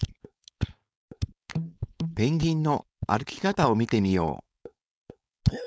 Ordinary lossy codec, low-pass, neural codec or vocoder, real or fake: none; none; codec, 16 kHz, 4.8 kbps, FACodec; fake